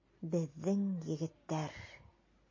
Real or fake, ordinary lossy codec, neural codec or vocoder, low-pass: real; MP3, 32 kbps; none; 7.2 kHz